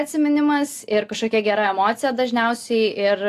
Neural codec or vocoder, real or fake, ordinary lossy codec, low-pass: none; real; AAC, 64 kbps; 14.4 kHz